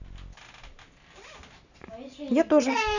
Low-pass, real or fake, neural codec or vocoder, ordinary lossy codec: 7.2 kHz; real; none; none